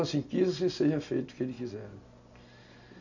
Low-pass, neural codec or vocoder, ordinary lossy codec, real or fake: 7.2 kHz; none; none; real